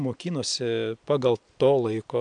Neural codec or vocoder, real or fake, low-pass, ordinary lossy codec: none; real; 9.9 kHz; AAC, 64 kbps